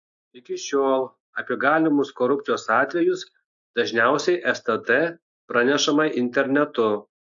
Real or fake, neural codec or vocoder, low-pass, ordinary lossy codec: real; none; 7.2 kHz; AAC, 64 kbps